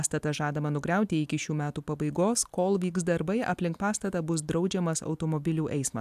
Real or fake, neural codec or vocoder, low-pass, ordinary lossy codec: real; none; 14.4 kHz; AAC, 96 kbps